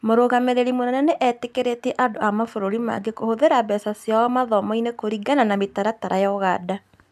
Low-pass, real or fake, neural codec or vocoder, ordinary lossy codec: 14.4 kHz; real; none; none